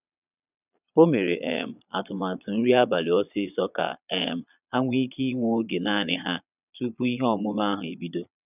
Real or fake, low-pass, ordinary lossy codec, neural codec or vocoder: fake; 3.6 kHz; none; vocoder, 22.05 kHz, 80 mel bands, Vocos